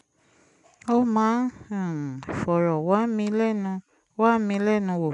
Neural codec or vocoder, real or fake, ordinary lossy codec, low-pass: none; real; none; 10.8 kHz